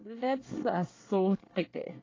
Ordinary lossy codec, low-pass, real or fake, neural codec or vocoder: AAC, 32 kbps; 7.2 kHz; fake; codec, 24 kHz, 1 kbps, SNAC